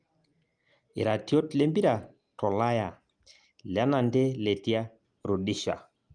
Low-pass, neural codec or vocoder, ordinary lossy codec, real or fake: 9.9 kHz; none; Opus, 32 kbps; real